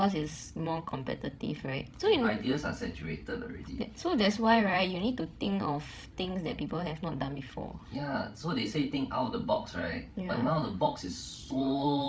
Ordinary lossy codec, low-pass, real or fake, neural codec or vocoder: none; none; fake; codec, 16 kHz, 16 kbps, FreqCodec, larger model